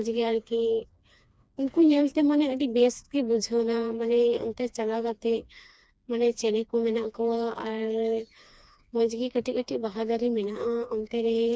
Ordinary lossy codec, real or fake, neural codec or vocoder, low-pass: none; fake; codec, 16 kHz, 2 kbps, FreqCodec, smaller model; none